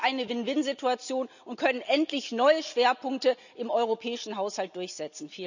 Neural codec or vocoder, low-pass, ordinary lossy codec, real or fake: none; 7.2 kHz; none; real